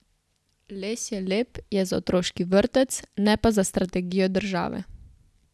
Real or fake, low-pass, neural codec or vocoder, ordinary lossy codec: real; none; none; none